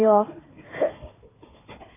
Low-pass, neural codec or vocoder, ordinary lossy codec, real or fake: 3.6 kHz; codec, 16 kHz, 4 kbps, FunCodec, trained on Chinese and English, 50 frames a second; AAC, 16 kbps; fake